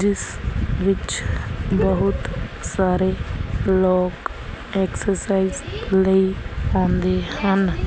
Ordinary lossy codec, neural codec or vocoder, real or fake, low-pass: none; none; real; none